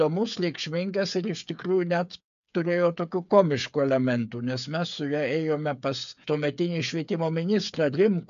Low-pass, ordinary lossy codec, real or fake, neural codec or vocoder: 7.2 kHz; AAC, 96 kbps; fake; codec, 16 kHz, 8 kbps, FreqCodec, smaller model